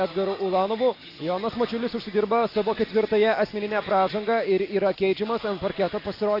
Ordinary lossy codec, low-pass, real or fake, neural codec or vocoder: AAC, 32 kbps; 5.4 kHz; real; none